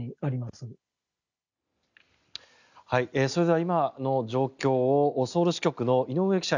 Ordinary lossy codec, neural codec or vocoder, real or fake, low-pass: none; none; real; 7.2 kHz